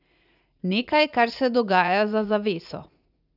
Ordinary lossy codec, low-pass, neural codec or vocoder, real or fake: none; 5.4 kHz; vocoder, 44.1 kHz, 80 mel bands, Vocos; fake